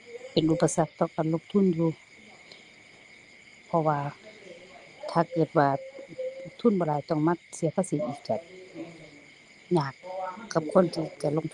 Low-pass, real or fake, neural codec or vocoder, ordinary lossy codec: 10.8 kHz; real; none; Opus, 24 kbps